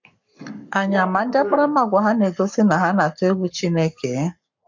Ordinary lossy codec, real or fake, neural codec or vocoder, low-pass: MP3, 48 kbps; fake; codec, 44.1 kHz, 7.8 kbps, Pupu-Codec; 7.2 kHz